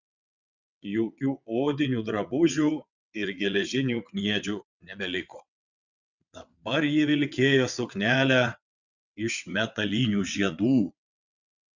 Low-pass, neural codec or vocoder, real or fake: 7.2 kHz; vocoder, 22.05 kHz, 80 mel bands, WaveNeXt; fake